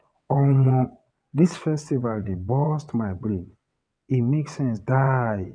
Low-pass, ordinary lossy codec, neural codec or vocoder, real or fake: 9.9 kHz; none; vocoder, 22.05 kHz, 80 mel bands, WaveNeXt; fake